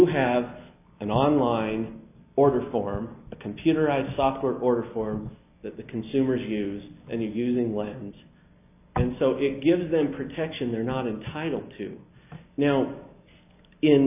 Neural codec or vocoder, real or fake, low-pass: none; real; 3.6 kHz